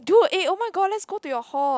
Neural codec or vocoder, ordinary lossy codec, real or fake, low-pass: none; none; real; none